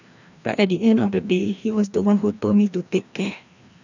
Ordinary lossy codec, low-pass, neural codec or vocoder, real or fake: none; 7.2 kHz; codec, 16 kHz, 1 kbps, FreqCodec, larger model; fake